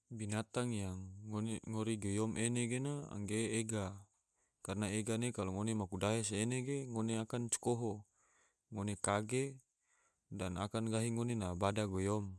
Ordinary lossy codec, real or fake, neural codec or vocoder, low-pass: none; real; none; none